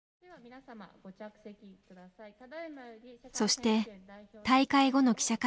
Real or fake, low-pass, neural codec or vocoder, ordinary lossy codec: real; none; none; none